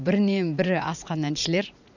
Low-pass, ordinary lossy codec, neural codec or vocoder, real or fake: 7.2 kHz; none; vocoder, 44.1 kHz, 80 mel bands, Vocos; fake